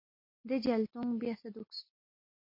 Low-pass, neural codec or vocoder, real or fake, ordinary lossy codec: 5.4 kHz; none; real; MP3, 32 kbps